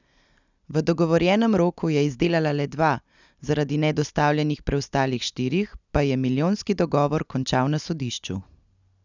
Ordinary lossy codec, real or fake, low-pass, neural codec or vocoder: none; real; 7.2 kHz; none